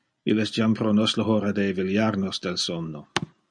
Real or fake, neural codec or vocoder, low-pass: real; none; 9.9 kHz